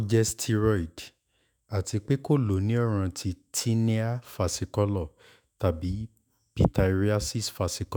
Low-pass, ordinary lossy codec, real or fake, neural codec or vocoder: none; none; fake; autoencoder, 48 kHz, 128 numbers a frame, DAC-VAE, trained on Japanese speech